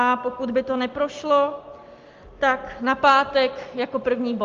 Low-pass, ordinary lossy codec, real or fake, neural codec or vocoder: 7.2 kHz; Opus, 32 kbps; real; none